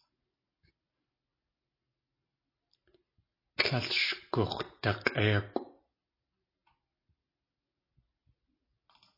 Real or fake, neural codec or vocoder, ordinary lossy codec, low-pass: real; none; MP3, 24 kbps; 5.4 kHz